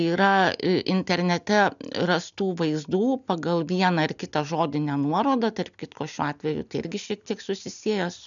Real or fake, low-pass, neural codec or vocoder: real; 7.2 kHz; none